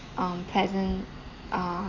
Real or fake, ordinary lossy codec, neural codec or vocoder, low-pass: real; Opus, 64 kbps; none; 7.2 kHz